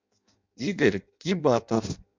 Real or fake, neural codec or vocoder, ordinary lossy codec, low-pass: fake; codec, 16 kHz in and 24 kHz out, 0.6 kbps, FireRedTTS-2 codec; MP3, 64 kbps; 7.2 kHz